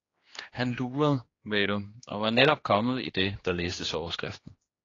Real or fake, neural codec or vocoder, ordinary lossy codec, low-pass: fake; codec, 16 kHz, 2 kbps, X-Codec, HuBERT features, trained on general audio; AAC, 32 kbps; 7.2 kHz